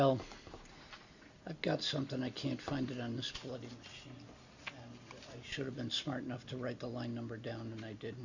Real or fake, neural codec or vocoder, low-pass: real; none; 7.2 kHz